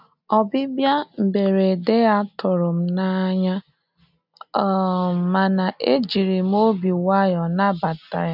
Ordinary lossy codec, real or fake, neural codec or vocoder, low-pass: none; real; none; 5.4 kHz